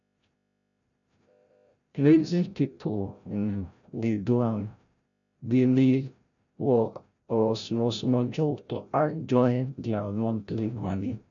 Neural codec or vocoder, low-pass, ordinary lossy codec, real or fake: codec, 16 kHz, 0.5 kbps, FreqCodec, larger model; 7.2 kHz; none; fake